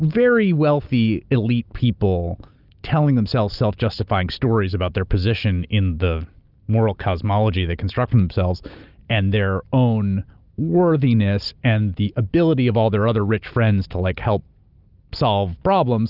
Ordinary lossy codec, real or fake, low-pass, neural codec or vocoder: Opus, 24 kbps; real; 5.4 kHz; none